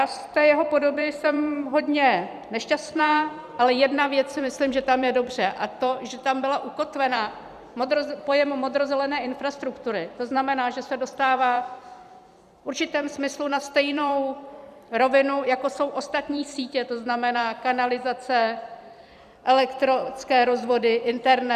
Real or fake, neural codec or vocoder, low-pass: fake; vocoder, 44.1 kHz, 128 mel bands every 256 samples, BigVGAN v2; 14.4 kHz